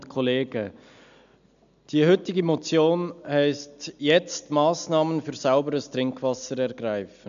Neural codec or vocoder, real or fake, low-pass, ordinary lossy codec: none; real; 7.2 kHz; none